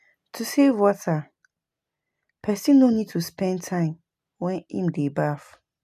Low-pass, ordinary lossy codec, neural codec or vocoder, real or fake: 14.4 kHz; none; none; real